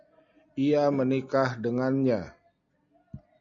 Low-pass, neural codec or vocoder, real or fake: 7.2 kHz; none; real